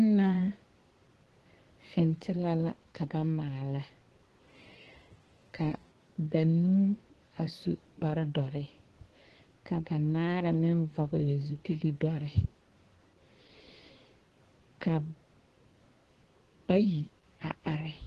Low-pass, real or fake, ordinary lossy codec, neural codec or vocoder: 10.8 kHz; fake; Opus, 16 kbps; codec, 24 kHz, 1 kbps, SNAC